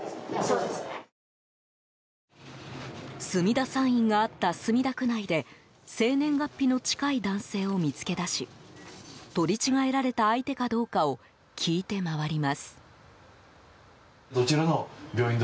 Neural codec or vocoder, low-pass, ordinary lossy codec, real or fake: none; none; none; real